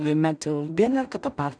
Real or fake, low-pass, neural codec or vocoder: fake; 9.9 kHz; codec, 16 kHz in and 24 kHz out, 0.4 kbps, LongCat-Audio-Codec, two codebook decoder